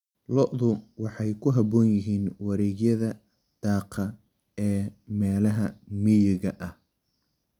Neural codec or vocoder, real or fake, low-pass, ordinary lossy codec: none; real; 19.8 kHz; none